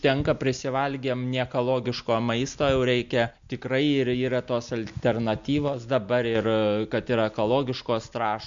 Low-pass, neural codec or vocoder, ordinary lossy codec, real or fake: 7.2 kHz; none; MP3, 64 kbps; real